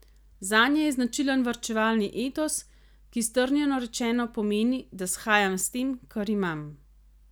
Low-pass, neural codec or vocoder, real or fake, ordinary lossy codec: none; none; real; none